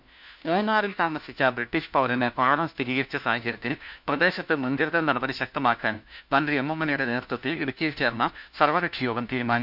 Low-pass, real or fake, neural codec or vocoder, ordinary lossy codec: 5.4 kHz; fake; codec, 16 kHz, 1 kbps, FunCodec, trained on LibriTTS, 50 frames a second; none